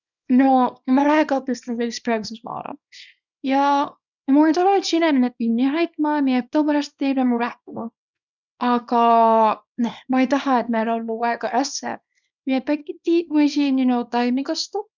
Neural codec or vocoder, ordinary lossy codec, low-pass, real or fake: codec, 24 kHz, 0.9 kbps, WavTokenizer, small release; none; 7.2 kHz; fake